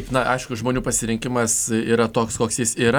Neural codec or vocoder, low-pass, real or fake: none; 19.8 kHz; real